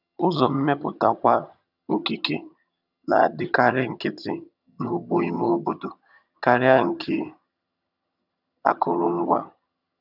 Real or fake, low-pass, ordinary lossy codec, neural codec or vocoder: fake; 5.4 kHz; none; vocoder, 22.05 kHz, 80 mel bands, HiFi-GAN